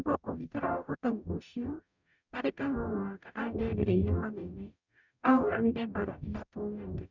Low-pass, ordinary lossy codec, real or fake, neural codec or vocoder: 7.2 kHz; none; fake; codec, 44.1 kHz, 0.9 kbps, DAC